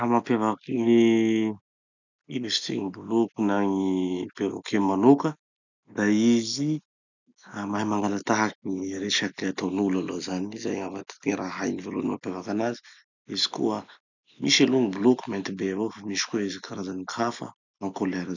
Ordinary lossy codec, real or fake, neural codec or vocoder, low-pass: none; real; none; 7.2 kHz